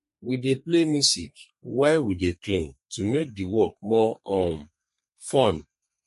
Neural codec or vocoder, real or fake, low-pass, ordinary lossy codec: codec, 32 kHz, 1.9 kbps, SNAC; fake; 14.4 kHz; MP3, 48 kbps